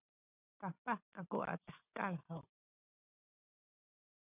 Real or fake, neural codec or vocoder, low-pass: real; none; 3.6 kHz